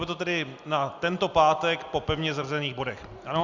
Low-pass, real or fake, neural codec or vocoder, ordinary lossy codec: 7.2 kHz; real; none; Opus, 64 kbps